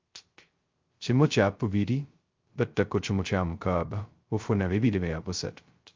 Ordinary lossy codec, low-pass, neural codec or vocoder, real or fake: Opus, 32 kbps; 7.2 kHz; codec, 16 kHz, 0.2 kbps, FocalCodec; fake